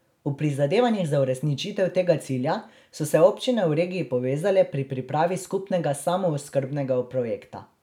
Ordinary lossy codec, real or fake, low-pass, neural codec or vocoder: none; real; 19.8 kHz; none